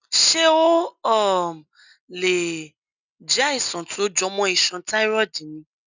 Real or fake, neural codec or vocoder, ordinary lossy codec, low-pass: real; none; none; 7.2 kHz